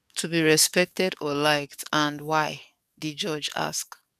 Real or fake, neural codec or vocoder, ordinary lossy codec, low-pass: fake; codec, 44.1 kHz, 7.8 kbps, DAC; none; 14.4 kHz